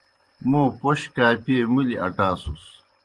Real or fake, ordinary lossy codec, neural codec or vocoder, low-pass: fake; Opus, 24 kbps; vocoder, 24 kHz, 100 mel bands, Vocos; 10.8 kHz